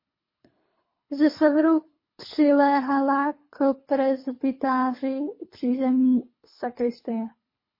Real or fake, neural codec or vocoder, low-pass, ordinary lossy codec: fake; codec, 24 kHz, 3 kbps, HILCodec; 5.4 kHz; MP3, 24 kbps